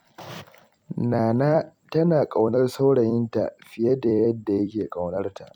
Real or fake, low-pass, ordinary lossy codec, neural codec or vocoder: fake; 19.8 kHz; none; vocoder, 44.1 kHz, 128 mel bands every 256 samples, BigVGAN v2